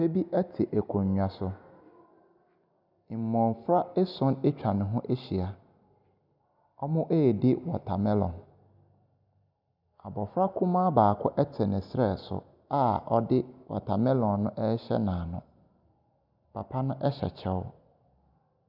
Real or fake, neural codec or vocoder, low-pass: real; none; 5.4 kHz